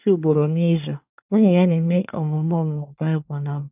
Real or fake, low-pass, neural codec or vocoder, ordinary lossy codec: fake; 3.6 kHz; codec, 24 kHz, 1 kbps, SNAC; none